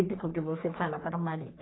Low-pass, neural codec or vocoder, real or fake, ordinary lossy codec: 7.2 kHz; codec, 44.1 kHz, 3.4 kbps, Pupu-Codec; fake; AAC, 16 kbps